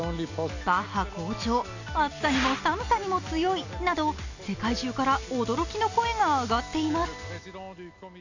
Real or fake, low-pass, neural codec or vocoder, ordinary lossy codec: real; 7.2 kHz; none; none